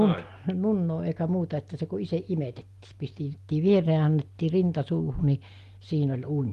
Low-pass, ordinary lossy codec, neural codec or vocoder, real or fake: 14.4 kHz; Opus, 24 kbps; none; real